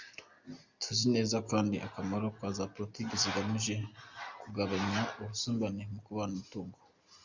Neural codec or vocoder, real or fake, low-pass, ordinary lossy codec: none; real; 7.2 kHz; Opus, 64 kbps